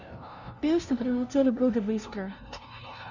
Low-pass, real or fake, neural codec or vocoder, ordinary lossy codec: 7.2 kHz; fake; codec, 16 kHz, 0.5 kbps, FunCodec, trained on LibriTTS, 25 frames a second; none